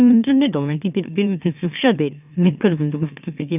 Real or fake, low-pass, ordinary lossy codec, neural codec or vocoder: fake; 3.6 kHz; none; autoencoder, 44.1 kHz, a latent of 192 numbers a frame, MeloTTS